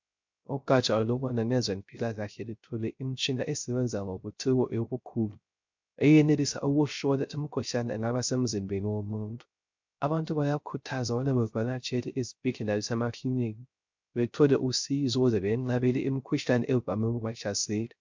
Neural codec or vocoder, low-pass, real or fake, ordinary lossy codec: codec, 16 kHz, 0.3 kbps, FocalCodec; 7.2 kHz; fake; MP3, 64 kbps